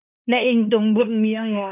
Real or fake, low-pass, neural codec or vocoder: fake; 3.6 kHz; codec, 16 kHz in and 24 kHz out, 0.9 kbps, LongCat-Audio-Codec, fine tuned four codebook decoder